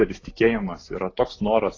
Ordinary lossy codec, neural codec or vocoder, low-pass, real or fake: AAC, 32 kbps; none; 7.2 kHz; real